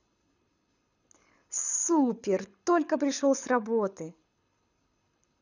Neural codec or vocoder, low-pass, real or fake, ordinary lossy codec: codec, 24 kHz, 6 kbps, HILCodec; 7.2 kHz; fake; none